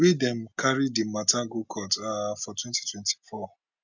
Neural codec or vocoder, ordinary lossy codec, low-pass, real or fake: none; none; 7.2 kHz; real